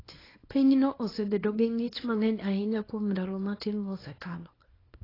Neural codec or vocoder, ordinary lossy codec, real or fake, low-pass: codec, 24 kHz, 0.9 kbps, WavTokenizer, small release; AAC, 24 kbps; fake; 5.4 kHz